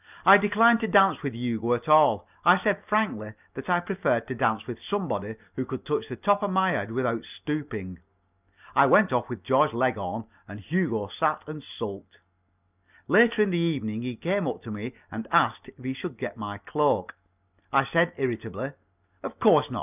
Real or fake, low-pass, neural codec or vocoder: real; 3.6 kHz; none